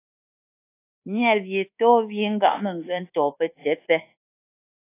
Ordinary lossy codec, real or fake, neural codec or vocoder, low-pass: AAC, 24 kbps; fake; codec, 24 kHz, 1.2 kbps, DualCodec; 3.6 kHz